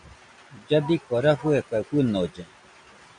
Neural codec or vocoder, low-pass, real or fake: none; 9.9 kHz; real